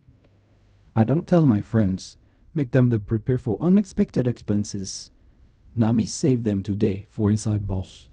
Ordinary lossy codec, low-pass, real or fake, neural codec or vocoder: none; 10.8 kHz; fake; codec, 16 kHz in and 24 kHz out, 0.4 kbps, LongCat-Audio-Codec, fine tuned four codebook decoder